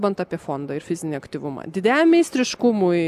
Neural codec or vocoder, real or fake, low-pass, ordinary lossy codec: none; real; 14.4 kHz; AAC, 96 kbps